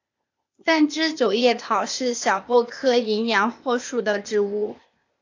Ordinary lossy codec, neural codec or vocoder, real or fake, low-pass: AAC, 48 kbps; codec, 16 kHz, 0.8 kbps, ZipCodec; fake; 7.2 kHz